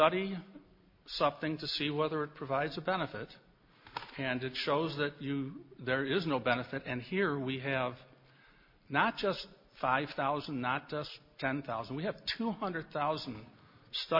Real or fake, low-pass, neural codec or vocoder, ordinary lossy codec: real; 5.4 kHz; none; MP3, 32 kbps